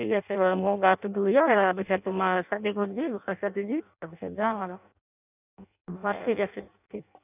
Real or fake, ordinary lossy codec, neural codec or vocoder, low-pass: fake; none; codec, 16 kHz in and 24 kHz out, 0.6 kbps, FireRedTTS-2 codec; 3.6 kHz